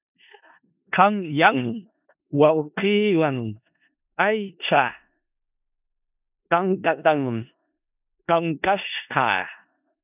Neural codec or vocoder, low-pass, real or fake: codec, 16 kHz in and 24 kHz out, 0.4 kbps, LongCat-Audio-Codec, four codebook decoder; 3.6 kHz; fake